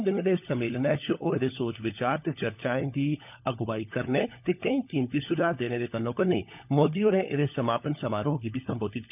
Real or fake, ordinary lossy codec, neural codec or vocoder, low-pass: fake; none; codec, 16 kHz, 16 kbps, FunCodec, trained on LibriTTS, 50 frames a second; 3.6 kHz